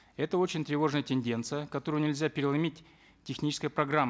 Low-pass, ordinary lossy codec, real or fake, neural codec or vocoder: none; none; real; none